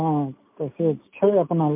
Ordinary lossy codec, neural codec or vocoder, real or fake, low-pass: MP3, 32 kbps; none; real; 3.6 kHz